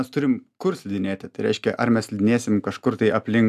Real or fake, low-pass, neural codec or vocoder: real; 14.4 kHz; none